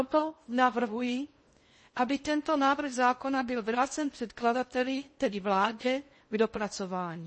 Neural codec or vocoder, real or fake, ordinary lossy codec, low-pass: codec, 16 kHz in and 24 kHz out, 0.6 kbps, FocalCodec, streaming, 2048 codes; fake; MP3, 32 kbps; 9.9 kHz